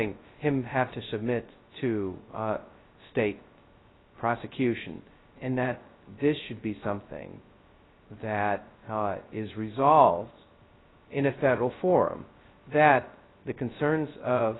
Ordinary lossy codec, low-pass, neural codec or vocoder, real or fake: AAC, 16 kbps; 7.2 kHz; codec, 16 kHz, 0.2 kbps, FocalCodec; fake